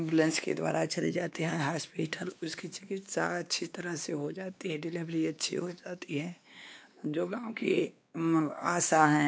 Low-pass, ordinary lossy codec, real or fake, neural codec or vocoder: none; none; fake; codec, 16 kHz, 2 kbps, X-Codec, WavLM features, trained on Multilingual LibriSpeech